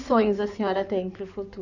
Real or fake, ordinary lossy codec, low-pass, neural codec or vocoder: fake; none; 7.2 kHz; codec, 16 kHz in and 24 kHz out, 2.2 kbps, FireRedTTS-2 codec